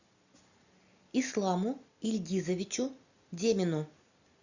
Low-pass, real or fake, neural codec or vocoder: 7.2 kHz; real; none